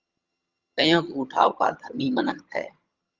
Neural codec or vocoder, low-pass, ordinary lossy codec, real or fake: vocoder, 22.05 kHz, 80 mel bands, HiFi-GAN; 7.2 kHz; Opus, 32 kbps; fake